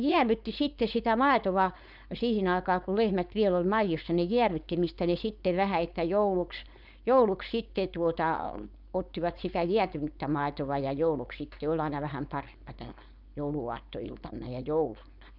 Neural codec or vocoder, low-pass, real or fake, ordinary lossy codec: codec, 16 kHz, 4.8 kbps, FACodec; 5.4 kHz; fake; none